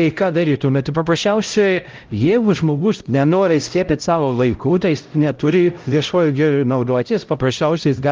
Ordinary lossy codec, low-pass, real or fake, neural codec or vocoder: Opus, 32 kbps; 7.2 kHz; fake; codec, 16 kHz, 0.5 kbps, X-Codec, HuBERT features, trained on LibriSpeech